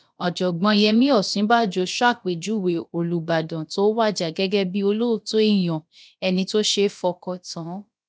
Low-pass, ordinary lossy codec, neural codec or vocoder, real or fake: none; none; codec, 16 kHz, about 1 kbps, DyCAST, with the encoder's durations; fake